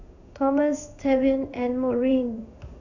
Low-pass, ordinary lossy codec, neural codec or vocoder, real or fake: 7.2 kHz; none; codec, 16 kHz in and 24 kHz out, 1 kbps, XY-Tokenizer; fake